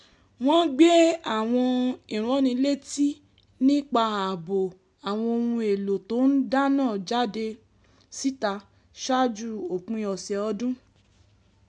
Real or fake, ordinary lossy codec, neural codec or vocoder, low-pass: real; MP3, 96 kbps; none; 10.8 kHz